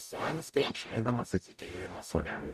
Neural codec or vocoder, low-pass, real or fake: codec, 44.1 kHz, 0.9 kbps, DAC; 14.4 kHz; fake